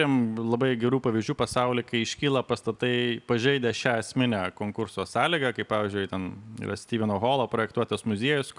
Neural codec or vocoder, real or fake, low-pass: none; real; 10.8 kHz